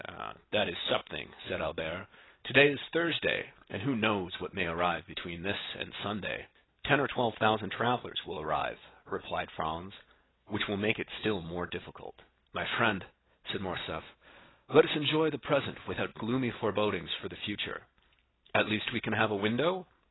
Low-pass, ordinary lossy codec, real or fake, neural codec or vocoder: 7.2 kHz; AAC, 16 kbps; real; none